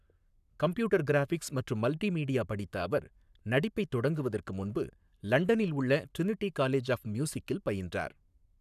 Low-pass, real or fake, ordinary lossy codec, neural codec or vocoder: 14.4 kHz; fake; none; codec, 44.1 kHz, 7.8 kbps, Pupu-Codec